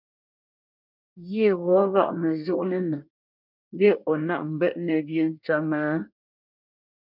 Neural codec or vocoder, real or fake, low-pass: codec, 24 kHz, 1 kbps, SNAC; fake; 5.4 kHz